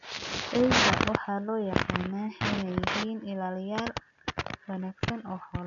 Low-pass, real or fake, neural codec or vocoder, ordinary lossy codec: 7.2 kHz; real; none; none